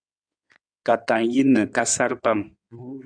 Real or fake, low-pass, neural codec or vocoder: fake; 9.9 kHz; codec, 16 kHz in and 24 kHz out, 2.2 kbps, FireRedTTS-2 codec